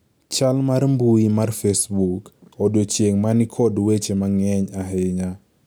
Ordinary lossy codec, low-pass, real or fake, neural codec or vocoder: none; none; real; none